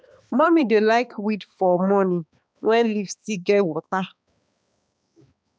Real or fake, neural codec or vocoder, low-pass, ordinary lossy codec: fake; codec, 16 kHz, 2 kbps, X-Codec, HuBERT features, trained on balanced general audio; none; none